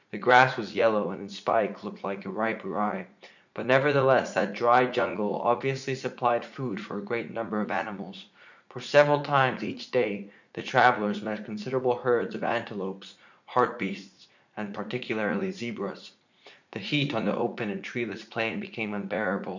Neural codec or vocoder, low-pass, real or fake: vocoder, 44.1 kHz, 80 mel bands, Vocos; 7.2 kHz; fake